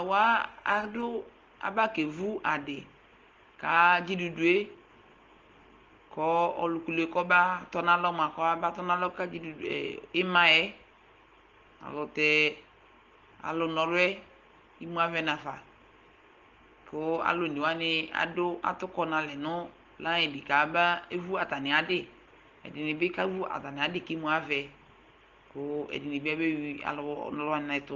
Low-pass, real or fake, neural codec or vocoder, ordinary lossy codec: 7.2 kHz; real; none; Opus, 16 kbps